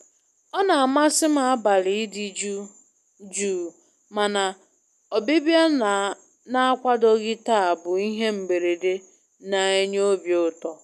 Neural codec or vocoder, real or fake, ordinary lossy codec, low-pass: none; real; none; 14.4 kHz